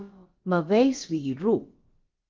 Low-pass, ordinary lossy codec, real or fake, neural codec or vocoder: 7.2 kHz; Opus, 32 kbps; fake; codec, 16 kHz, about 1 kbps, DyCAST, with the encoder's durations